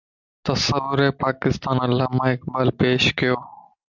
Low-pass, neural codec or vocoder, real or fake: 7.2 kHz; none; real